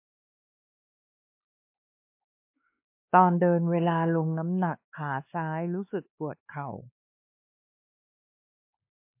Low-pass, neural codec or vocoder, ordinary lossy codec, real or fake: 3.6 kHz; codec, 16 kHz, 2 kbps, X-Codec, WavLM features, trained on Multilingual LibriSpeech; MP3, 32 kbps; fake